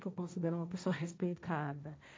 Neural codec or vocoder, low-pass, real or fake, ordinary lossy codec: codec, 16 kHz, 1.1 kbps, Voila-Tokenizer; none; fake; none